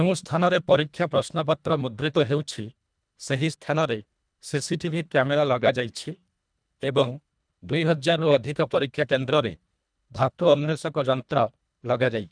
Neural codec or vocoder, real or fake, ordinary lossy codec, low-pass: codec, 24 kHz, 1.5 kbps, HILCodec; fake; none; 9.9 kHz